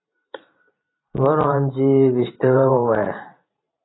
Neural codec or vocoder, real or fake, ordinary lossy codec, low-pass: vocoder, 44.1 kHz, 128 mel bands every 512 samples, BigVGAN v2; fake; AAC, 16 kbps; 7.2 kHz